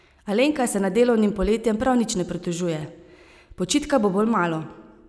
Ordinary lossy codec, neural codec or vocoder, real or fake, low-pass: none; none; real; none